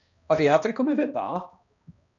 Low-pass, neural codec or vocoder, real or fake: 7.2 kHz; codec, 16 kHz, 1 kbps, X-Codec, HuBERT features, trained on balanced general audio; fake